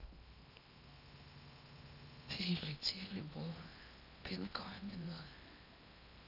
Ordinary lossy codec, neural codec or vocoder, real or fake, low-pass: none; codec, 16 kHz in and 24 kHz out, 0.8 kbps, FocalCodec, streaming, 65536 codes; fake; 5.4 kHz